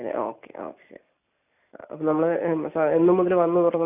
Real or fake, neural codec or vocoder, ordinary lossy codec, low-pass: real; none; none; 3.6 kHz